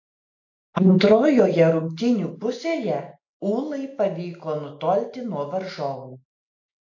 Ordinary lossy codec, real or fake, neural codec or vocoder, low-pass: AAC, 48 kbps; real; none; 7.2 kHz